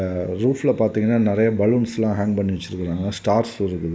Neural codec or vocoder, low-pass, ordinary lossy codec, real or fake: none; none; none; real